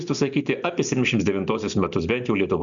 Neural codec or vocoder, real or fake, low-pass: none; real; 7.2 kHz